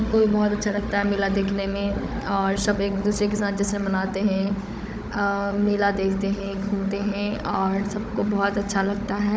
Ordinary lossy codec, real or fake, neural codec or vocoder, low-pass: none; fake; codec, 16 kHz, 16 kbps, FunCodec, trained on Chinese and English, 50 frames a second; none